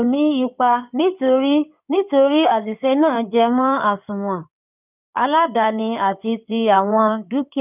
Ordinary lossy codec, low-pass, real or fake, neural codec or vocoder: none; 3.6 kHz; fake; codec, 16 kHz in and 24 kHz out, 2.2 kbps, FireRedTTS-2 codec